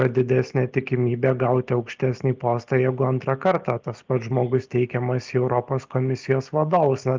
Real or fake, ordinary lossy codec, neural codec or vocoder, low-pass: real; Opus, 24 kbps; none; 7.2 kHz